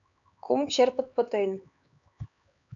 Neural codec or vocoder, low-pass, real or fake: codec, 16 kHz, 4 kbps, X-Codec, HuBERT features, trained on LibriSpeech; 7.2 kHz; fake